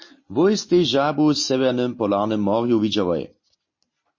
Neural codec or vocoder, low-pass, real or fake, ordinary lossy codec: none; 7.2 kHz; real; MP3, 32 kbps